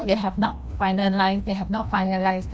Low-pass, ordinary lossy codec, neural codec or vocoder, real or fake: none; none; codec, 16 kHz, 1 kbps, FreqCodec, larger model; fake